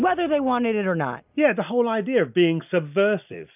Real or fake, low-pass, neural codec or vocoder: real; 3.6 kHz; none